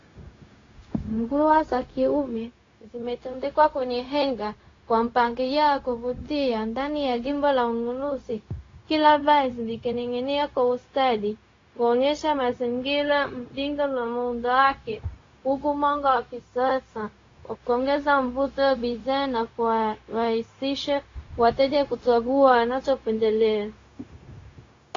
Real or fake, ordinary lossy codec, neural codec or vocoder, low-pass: fake; AAC, 32 kbps; codec, 16 kHz, 0.4 kbps, LongCat-Audio-Codec; 7.2 kHz